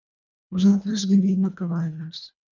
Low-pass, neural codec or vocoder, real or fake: 7.2 kHz; codec, 24 kHz, 1.5 kbps, HILCodec; fake